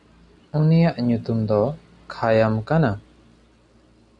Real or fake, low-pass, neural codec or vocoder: real; 10.8 kHz; none